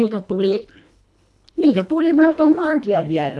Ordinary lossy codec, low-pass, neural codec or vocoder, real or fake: none; none; codec, 24 kHz, 1.5 kbps, HILCodec; fake